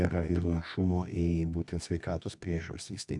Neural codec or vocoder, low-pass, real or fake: codec, 24 kHz, 0.9 kbps, WavTokenizer, medium music audio release; 10.8 kHz; fake